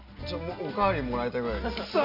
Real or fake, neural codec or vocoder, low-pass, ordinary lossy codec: real; none; 5.4 kHz; none